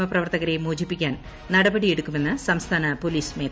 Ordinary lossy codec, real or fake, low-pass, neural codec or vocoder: none; real; none; none